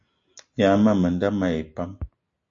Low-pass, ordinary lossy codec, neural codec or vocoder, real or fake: 7.2 kHz; AAC, 48 kbps; none; real